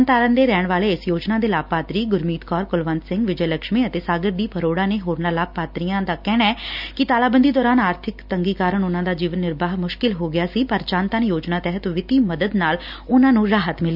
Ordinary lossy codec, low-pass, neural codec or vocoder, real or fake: none; 5.4 kHz; none; real